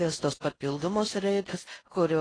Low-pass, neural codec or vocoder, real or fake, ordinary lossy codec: 9.9 kHz; codec, 16 kHz in and 24 kHz out, 0.6 kbps, FocalCodec, streaming, 4096 codes; fake; AAC, 32 kbps